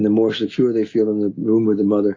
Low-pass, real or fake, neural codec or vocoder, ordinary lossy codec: 7.2 kHz; real; none; AAC, 32 kbps